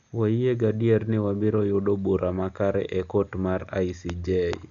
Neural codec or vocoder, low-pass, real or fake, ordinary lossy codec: none; 7.2 kHz; real; none